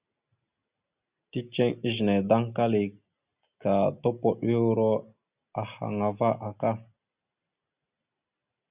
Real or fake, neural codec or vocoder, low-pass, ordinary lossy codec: real; none; 3.6 kHz; Opus, 64 kbps